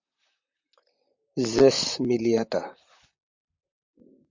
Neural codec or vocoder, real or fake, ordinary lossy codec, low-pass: vocoder, 44.1 kHz, 128 mel bands, Pupu-Vocoder; fake; MP3, 64 kbps; 7.2 kHz